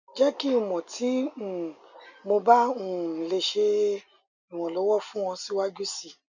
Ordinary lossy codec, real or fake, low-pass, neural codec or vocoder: none; real; 7.2 kHz; none